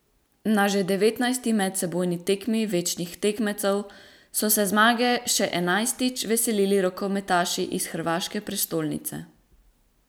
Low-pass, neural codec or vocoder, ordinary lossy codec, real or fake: none; none; none; real